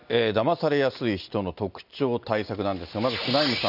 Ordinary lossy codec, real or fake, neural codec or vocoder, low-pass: none; real; none; 5.4 kHz